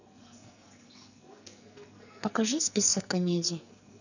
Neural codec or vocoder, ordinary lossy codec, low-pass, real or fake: codec, 44.1 kHz, 2.6 kbps, SNAC; none; 7.2 kHz; fake